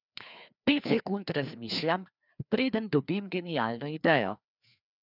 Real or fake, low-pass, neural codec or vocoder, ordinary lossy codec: fake; 5.4 kHz; codec, 16 kHz, 2 kbps, FreqCodec, larger model; none